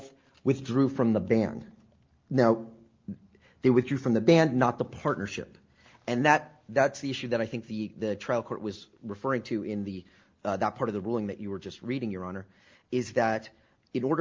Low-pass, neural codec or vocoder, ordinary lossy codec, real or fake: 7.2 kHz; none; Opus, 24 kbps; real